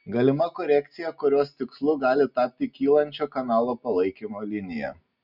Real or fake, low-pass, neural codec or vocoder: real; 5.4 kHz; none